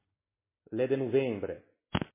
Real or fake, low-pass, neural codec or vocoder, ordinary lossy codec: real; 3.6 kHz; none; MP3, 16 kbps